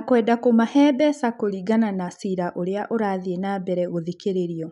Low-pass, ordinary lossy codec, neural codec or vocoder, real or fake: 10.8 kHz; none; none; real